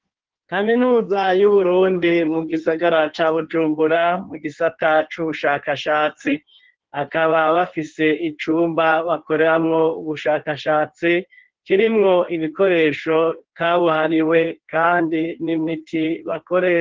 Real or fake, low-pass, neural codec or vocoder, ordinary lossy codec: fake; 7.2 kHz; codec, 16 kHz in and 24 kHz out, 1.1 kbps, FireRedTTS-2 codec; Opus, 16 kbps